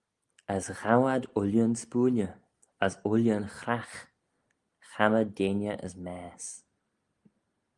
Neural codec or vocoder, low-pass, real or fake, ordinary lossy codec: none; 10.8 kHz; real; Opus, 32 kbps